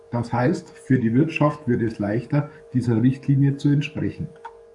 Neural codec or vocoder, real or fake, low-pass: codec, 44.1 kHz, 7.8 kbps, DAC; fake; 10.8 kHz